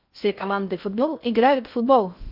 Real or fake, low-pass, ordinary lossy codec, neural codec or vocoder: fake; 5.4 kHz; MP3, 48 kbps; codec, 16 kHz in and 24 kHz out, 0.6 kbps, FocalCodec, streaming, 4096 codes